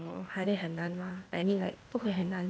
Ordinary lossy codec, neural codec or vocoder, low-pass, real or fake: none; codec, 16 kHz, 0.8 kbps, ZipCodec; none; fake